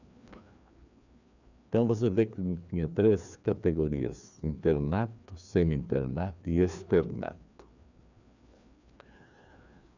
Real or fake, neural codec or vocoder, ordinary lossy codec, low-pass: fake; codec, 16 kHz, 2 kbps, FreqCodec, larger model; none; 7.2 kHz